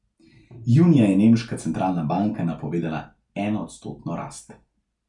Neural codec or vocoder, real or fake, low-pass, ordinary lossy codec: none; real; 10.8 kHz; none